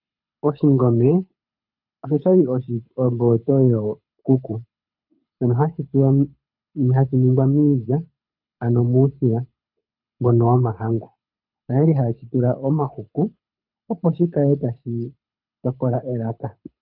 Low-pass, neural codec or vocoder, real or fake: 5.4 kHz; codec, 24 kHz, 6 kbps, HILCodec; fake